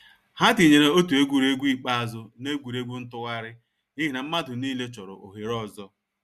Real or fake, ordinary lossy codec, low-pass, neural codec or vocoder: real; Opus, 64 kbps; 14.4 kHz; none